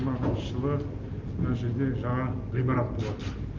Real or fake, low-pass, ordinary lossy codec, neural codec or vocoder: real; 7.2 kHz; Opus, 24 kbps; none